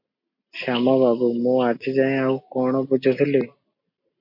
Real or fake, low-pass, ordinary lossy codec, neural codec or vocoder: real; 5.4 kHz; MP3, 32 kbps; none